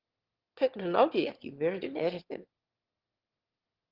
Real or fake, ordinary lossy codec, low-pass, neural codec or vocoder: fake; Opus, 16 kbps; 5.4 kHz; autoencoder, 22.05 kHz, a latent of 192 numbers a frame, VITS, trained on one speaker